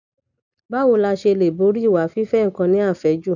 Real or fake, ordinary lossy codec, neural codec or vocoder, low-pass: real; none; none; 7.2 kHz